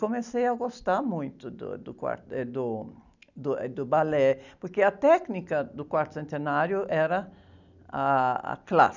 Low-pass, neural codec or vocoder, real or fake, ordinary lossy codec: 7.2 kHz; none; real; none